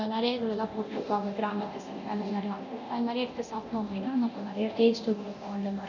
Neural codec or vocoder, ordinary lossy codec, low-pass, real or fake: codec, 24 kHz, 0.9 kbps, DualCodec; none; 7.2 kHz; fake